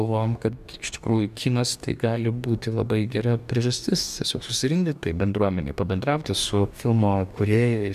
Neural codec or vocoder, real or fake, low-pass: codec, 44.1 kHz, 2.6 kbps, DAC; fake; 14.4 kHz